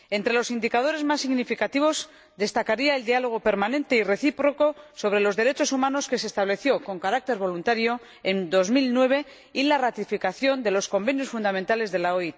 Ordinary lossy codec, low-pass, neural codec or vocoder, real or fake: none; none; none; real